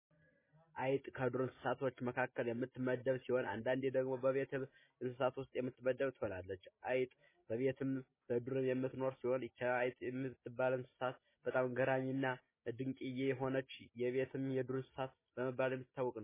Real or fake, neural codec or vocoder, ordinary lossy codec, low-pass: real; none; MP3, 16 kbps; 3.6 kHz